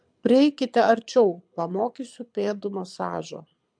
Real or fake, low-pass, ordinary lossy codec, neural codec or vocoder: fake; 9.9 kHz; MP3, 64 kbps; codec, 24 kHz, 6 kbps, HILCodec